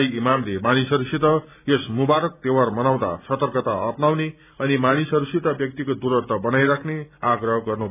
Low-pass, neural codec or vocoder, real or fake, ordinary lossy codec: 3.6 kHz; none; real; none